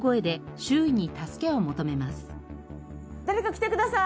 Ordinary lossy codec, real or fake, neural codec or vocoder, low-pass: none; real; none; none